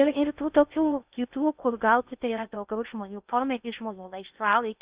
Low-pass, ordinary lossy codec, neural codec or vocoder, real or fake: 3.6 kHz; Opus, 64 kbps; codec, 16 kHz in and 24 kHz out, 0.6 kbps, FocalCodec, streaming, 2048 codes; fake